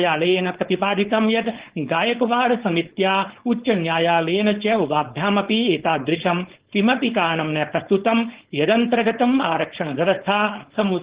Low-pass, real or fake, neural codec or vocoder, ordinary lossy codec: 3.6 kHz; fake; codec, 16 kHz, 4.8 kbps, FACodec; Opus, 16 kbps